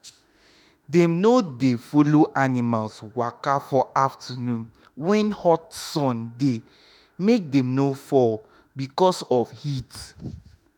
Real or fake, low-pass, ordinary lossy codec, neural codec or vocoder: fake; none; none; autoencoder, 48 kHz, 32 numbers a frame, DAC-VAE, trained on Japanese speech